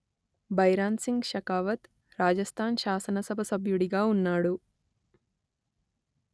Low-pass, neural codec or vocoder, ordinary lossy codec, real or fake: none; none; none; real